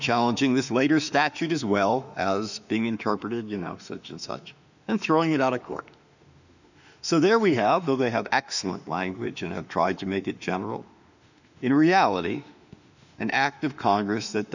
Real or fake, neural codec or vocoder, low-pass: fake; autoencoder, 48 kHz, 32 numbers a frame, DAC-VAE, trained on Japanese speech; 7.2 kHz